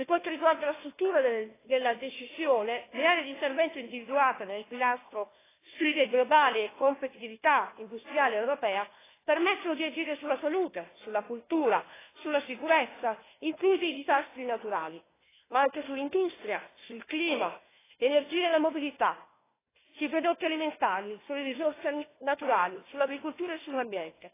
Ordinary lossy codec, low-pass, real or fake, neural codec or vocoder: AAC, 16 kbps; 3.6 kHz; fake; codec, 16 kHz, 1 kbps, FunCodec, trained on Chinese and English, 50 frames a second